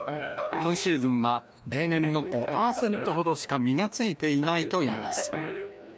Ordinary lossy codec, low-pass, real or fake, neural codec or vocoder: none; none; fake; codec, 16 kHz, 1 kbps, FreqCodec, larger model